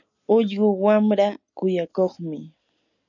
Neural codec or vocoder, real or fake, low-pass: none; real; 7.2 kHz